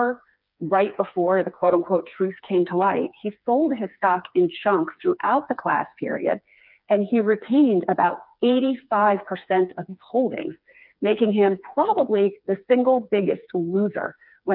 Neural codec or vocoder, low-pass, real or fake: codec, 16 kHz, 4 kbps, FreqCodec, smaller model; 5.4 kHz; fake